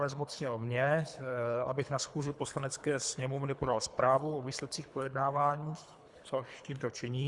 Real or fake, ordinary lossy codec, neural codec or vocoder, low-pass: fake; Opus, 64 kbps; codec, 24 kHz, 3 kbps, HILCodec; 10.8 kHz